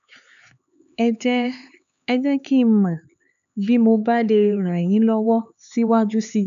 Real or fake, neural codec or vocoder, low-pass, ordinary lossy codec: fake; codec, 16 kHz, 4 kbps, X-Codec, HuBERT features, trained on LibriSpeech; 7.2 kHz; none